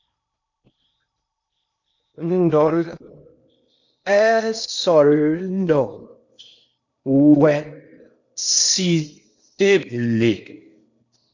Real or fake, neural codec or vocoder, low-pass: fake; codec, 16 kHz in and 24 kHz out, 0.8 kbps, FocalCodec, streaming, 65536 codes; 7.2 kHz